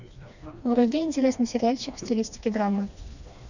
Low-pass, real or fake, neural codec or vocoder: 7.2 kHz; fake; codec, 16 kHz, 2 kbps, FreqCodec, smaller model